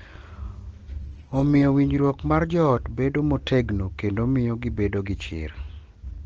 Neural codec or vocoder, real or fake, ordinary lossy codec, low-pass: none; real; Opus, 16 kbps; 7.2 kHz